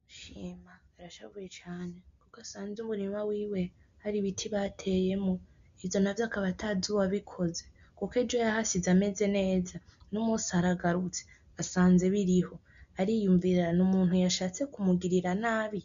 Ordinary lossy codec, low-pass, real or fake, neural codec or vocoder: MP3, 64 kbps; 7.2 kHz; real; none